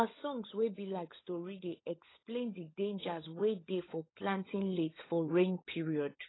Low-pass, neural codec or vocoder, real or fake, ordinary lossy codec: 7.2 kHz; codec, 16 kHz, 16 kbps, FunCodec, trained on LibriTTS, 50 frames a second; fake; AAC, 16 kbps